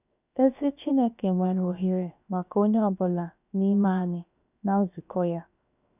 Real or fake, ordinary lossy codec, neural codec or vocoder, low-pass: fake; none; codec, 16 kHz, 0.7 kbps, FocalCodec; 3.6 kHz